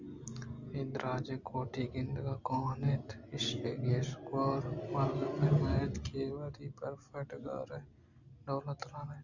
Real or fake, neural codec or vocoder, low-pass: real; none; 7.2 kHz